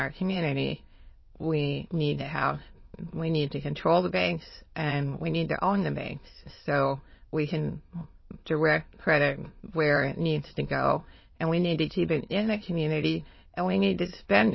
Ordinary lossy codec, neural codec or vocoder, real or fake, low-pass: MP3, 24 kbps; autoencoder, 22.05 kHz, a latent of 192 numbers a frame, VITS, trained on many speakers; fake; 7.2 kHz